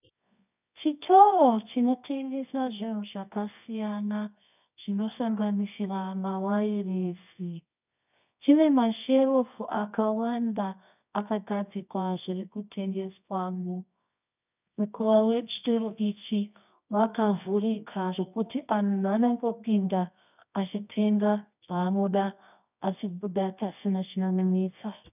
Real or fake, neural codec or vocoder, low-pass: fake; codec, 24 kHz, 0.9 kbps, WavTokenizer, medium music audio release; 3.6 kHz